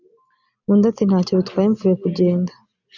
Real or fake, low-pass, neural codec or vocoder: real; 7.2 kHz; none